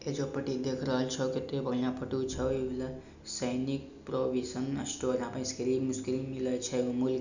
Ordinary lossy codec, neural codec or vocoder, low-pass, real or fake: none; none; 7.2 kHz; real